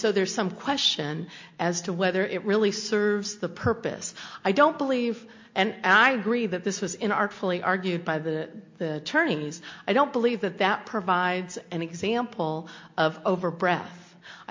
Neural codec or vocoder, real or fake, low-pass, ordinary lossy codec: none; real; 7.2 kHz; MP3, 48 kbps